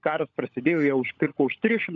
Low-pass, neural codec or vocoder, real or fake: 7.2 kHz; codec, 16 kHz, 16 kbps, FunCodec, trained on LibriTTS, 50 frames a second; fake